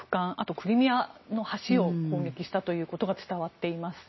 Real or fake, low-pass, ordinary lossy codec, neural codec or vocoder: real; 7.2 kHz; MP3, 24 kbps; none